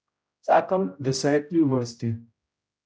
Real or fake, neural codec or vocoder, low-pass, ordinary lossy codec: fake; codec, 16 kHz, 0.5 kbps, X-Codec, HuBERT features, trained on general audio; none; none